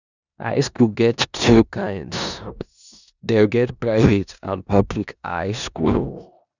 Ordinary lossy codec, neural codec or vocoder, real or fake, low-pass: none; codec, 16 kHz in and 24 kHz out, 0.9 kbps, LongCat-Audio-Codec, four codebook decoder; fake; 7.2 kHz